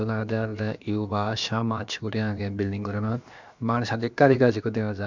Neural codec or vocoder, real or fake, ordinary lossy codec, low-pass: codec, 16 kHz, about 1 kbps, DyCAST, with the encoder's durations; fake; MP3, 64 kbps; 7.2 kHz